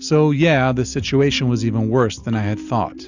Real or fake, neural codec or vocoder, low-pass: real; none; 7.2 kHz